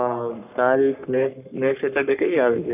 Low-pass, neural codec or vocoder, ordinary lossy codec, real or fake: 3.6 kHz; codec, 44.1 kHz, 3.4 kbps, Pupu-Codec; none; fake